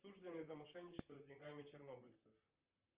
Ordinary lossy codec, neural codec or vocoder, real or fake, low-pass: Opus, 24 kbps; vocoder, 44.1 kHz, 128 mel bands, Pupu-Vocoder; fake; 3.6 kHz